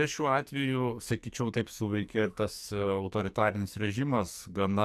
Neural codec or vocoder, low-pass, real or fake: codec, 44.1 kHz, 2.6 kbps, SNAC; 14.4 kHz; fake